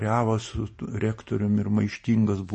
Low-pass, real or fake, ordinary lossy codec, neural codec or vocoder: 10.8 kHz; fake; MP3, 32 kbps; vocoder, 44.1 kHz, 128 mel bands every 512 samples, BigVGAN v2